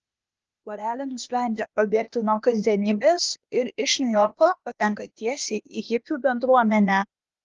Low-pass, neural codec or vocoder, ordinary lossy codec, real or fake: 7.2 kHz; codec, 16 kHz, 0.8 kbps, ZipCodec; Opus, 24 kbps; fake